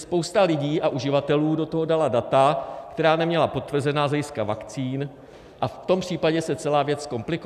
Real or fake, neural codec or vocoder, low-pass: real; none; 14.4 kHz